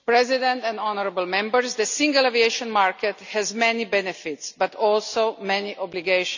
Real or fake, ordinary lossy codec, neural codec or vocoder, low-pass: real; none; none; 7.2 kHz